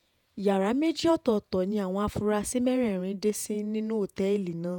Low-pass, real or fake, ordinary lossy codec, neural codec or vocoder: 19.8 kHz; fake; none; vocoder, 48 kHz, 128 mel bands, Vocos